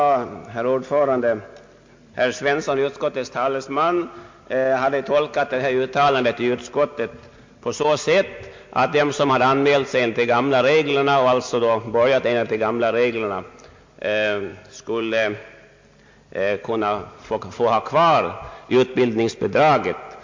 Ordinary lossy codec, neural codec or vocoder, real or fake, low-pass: MP3, 48 kbps; none; real; 7.2 kHz